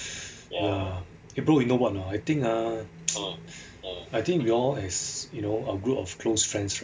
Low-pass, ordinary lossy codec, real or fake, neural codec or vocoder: none; none; real; none